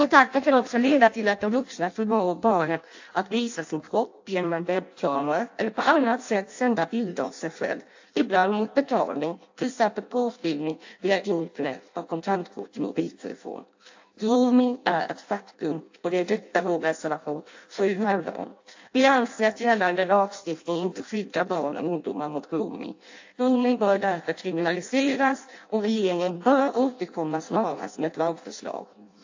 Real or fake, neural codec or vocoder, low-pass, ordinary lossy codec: fake; codec, 16 kHz in and 24 kHz out, 0.6 kbps, FireRedTTS-2 codec; 7.2 kHz; AAC, 48 kbps